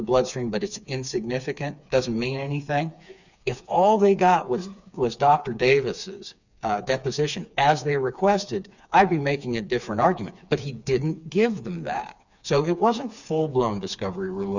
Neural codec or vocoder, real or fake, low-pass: codec, 16 kHz, 4 kbps, FreqCodec, smaller model; fake; 7.2 kHz